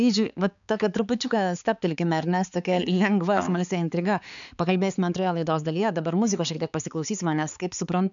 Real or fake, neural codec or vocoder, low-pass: fake; codec, 16 kHz, 4 kbps, X-Codec, HuBERT features, trained on balanced general audio; 7.2 kHz